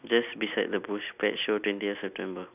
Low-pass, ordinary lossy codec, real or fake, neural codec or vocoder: 3.6 kHz; none; real; none